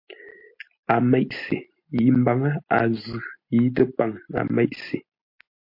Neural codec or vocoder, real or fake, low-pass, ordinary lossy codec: none; real; 5.4 kHz; MP3, 32 kbps